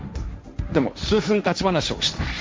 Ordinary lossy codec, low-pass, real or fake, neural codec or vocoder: none; none; fake; codec, 16 kHz, 1.1 kbps, Voila-Tokenizer